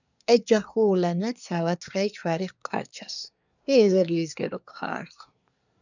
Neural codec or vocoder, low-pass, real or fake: codec, 24 kHz, 1 kbps, SNAC; 7.2 kHz; fake